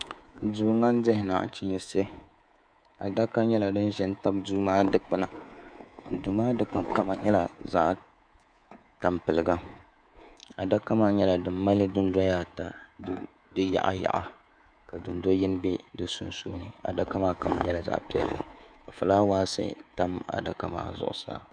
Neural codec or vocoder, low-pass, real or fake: codec, 24 kHz, 3.1 kbps, DualCodec; 9.9 kHz; fake